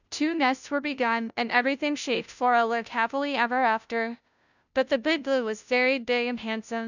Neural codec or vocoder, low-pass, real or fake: codec, 16 kHz, 0.5 kbps, FunCodec, trained on Chinese and English, 25 frames a second; 7.2 kHz; fake